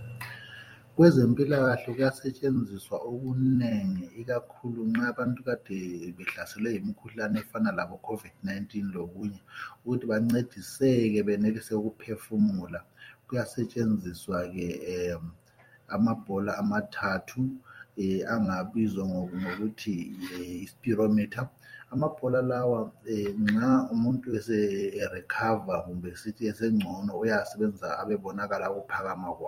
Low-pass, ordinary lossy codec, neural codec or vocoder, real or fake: 19.8 kHz; MP3, 64 kbps; vocoder, 44.1 kHz, 128 mel bands every 256 samples, BigVGAN v2; fake